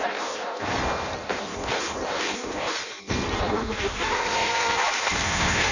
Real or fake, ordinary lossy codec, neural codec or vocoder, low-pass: fake; none; codec, 16 kHz in and 24 kHz out, 0.6 kbps, FireRedTTS-2 codec; 7.2 kHz